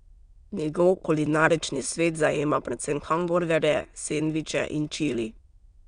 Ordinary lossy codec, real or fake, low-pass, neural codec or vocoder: Opus, 64 kbps; fake; 9.9 kHz; autoencoder, 22.05 kHz, a latent of 192 numbers a frame, VITS, trained on many speakers